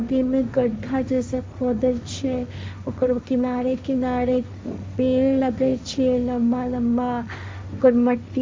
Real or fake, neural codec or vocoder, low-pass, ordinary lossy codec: fake; codec, 16 kHz, 1.1 kbps, Voila-Tokenizer; none; none